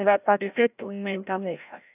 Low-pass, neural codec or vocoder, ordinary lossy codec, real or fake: 3.6 kHz; codec, 16 kHz, 0.5 kbps, FreqCodec, larger model; AAC, 32 kbps; fake